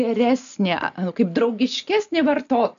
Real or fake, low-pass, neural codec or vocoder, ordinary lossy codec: real; 7.2 kHz; none; AAC, 64 kbps